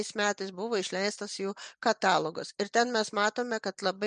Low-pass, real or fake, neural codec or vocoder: 9.9 kHz; real; none